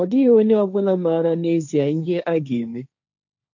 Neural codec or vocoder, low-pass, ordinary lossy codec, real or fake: codec, 16 kHz, 1.1 kbps, Voila-Tokenizer; 7.2 kHz; none; fake